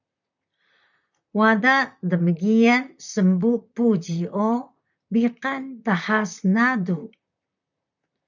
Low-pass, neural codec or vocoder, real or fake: 7.2 kHz; vocoder, 44.1 kHz, 128 mel bands, Pupu-Vocoder; fake